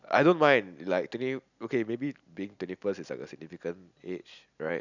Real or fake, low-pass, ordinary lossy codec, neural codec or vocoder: real; 7.2 kHz; none; none